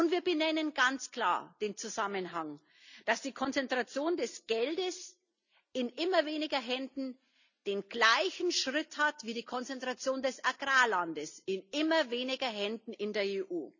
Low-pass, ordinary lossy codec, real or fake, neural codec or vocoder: 7.2 kHz; none; real; none